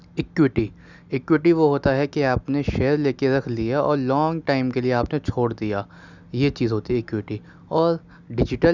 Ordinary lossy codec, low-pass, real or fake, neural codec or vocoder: none; 7.2 kHz; real; none